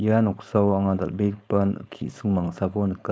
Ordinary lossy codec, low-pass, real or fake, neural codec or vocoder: none; none; fake; codec, 16 kHz, 4.8 kbps, FACodec